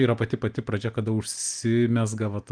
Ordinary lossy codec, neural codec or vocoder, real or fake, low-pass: Opus, 24 kbps; none; real; 9.9 kHz